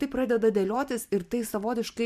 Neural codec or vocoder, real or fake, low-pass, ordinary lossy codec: vocoder, 44.1 kHz, 128 mel bands every 512 samples, BigVGAN v2; fake; 14.4 kHz; MP3, 96 kbps